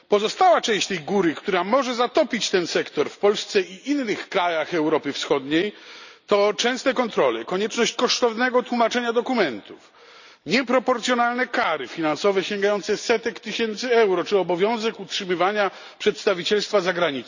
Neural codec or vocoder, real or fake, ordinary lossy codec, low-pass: none; real; none; 7.2 kHz